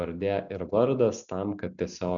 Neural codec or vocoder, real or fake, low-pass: none; real; 7.2 kHz